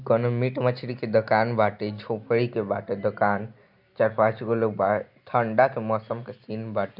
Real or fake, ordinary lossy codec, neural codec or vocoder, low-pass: real; none; none; 5.4 kHz